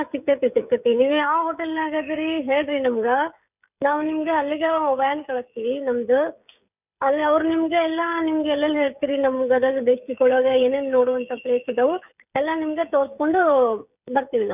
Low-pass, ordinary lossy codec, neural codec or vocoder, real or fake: 3.6 kHz; none; codec, 16 kHz, 16 kbps, FreqCodec, smaller model; fake